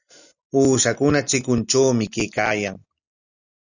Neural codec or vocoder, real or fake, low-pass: none; real; 7.2 kHz